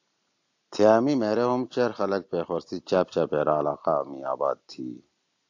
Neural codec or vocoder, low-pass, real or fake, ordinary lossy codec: none; 7.2 kHz; real; AAC, 48 kbps